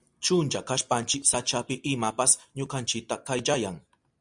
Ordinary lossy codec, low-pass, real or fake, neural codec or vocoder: MP3, 96 kbps; 10.8 kHz; real; none